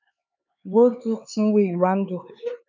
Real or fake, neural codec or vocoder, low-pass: fake; codec, 16 kHz, 4 kbps, X-Codec, HuBERT features, trained on LibriSpeech; 7.2 kHz